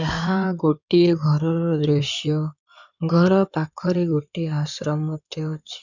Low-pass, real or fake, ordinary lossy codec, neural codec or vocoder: 7.2 kHz; fake; none; codec, 16 kHz in and 24 kHz out, 2.2 kbps, FireRedTTS-2 codec